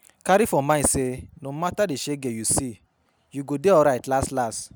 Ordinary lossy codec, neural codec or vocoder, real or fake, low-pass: none; none; real; none